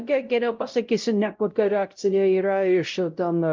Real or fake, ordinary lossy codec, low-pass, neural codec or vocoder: fake; Opus, 32 kbps; 7.2 kHz; codec, 16 kHz, 0.5 kbps, X-Codec, WavLM features, trained on Multilingual LibriSpeech